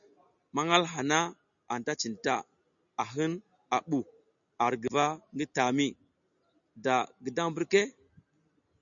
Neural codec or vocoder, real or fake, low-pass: none; real; 7.2 kHz